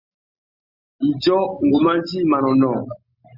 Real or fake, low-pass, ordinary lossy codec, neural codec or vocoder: real; 5.4 kHz; Opus, 64 kbps; none